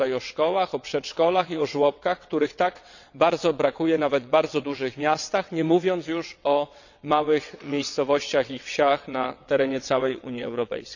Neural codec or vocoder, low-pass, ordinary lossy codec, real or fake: vocoder, 22.05 kHz, 80 mel bands, WaveNeXt; 7.2 kHz; none; fake